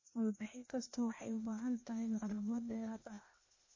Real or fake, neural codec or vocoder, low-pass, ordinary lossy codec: fake; codec, 16 kHz, 0.8 kbps, ZipCodec; 7.2 kHz; MP3, 32 kbps